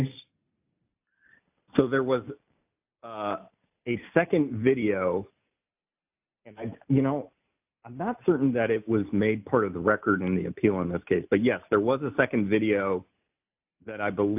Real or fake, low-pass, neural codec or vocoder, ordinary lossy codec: real; 3.6 kHz; none; AAC, 32 kbps